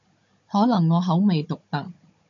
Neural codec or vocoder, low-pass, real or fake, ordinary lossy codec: codec, 16 kHz, 16 kbps, FunCodec, trained on Chinese and English, 50 frames a second; 7.2 kHz; fake; MP3, 64 kbps